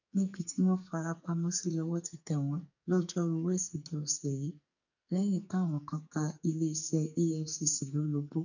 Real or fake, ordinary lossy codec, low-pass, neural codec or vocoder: fake; none; 7.2 kHz; codec, 44.1 kHz, 2.6 kbps, SNAC